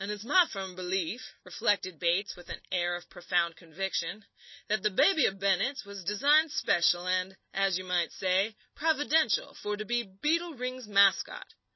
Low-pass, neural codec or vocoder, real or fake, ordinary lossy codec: 7.2 kHz; none; real; MP3, 24 kbps